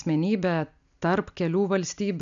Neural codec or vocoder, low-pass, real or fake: none; 7.2 kHz; real